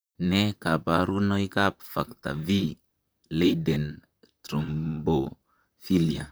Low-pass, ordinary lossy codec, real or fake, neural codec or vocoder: none; none; fake; vocoder, 44.1 kHz, 128 mel bands, Pupu-Vocoder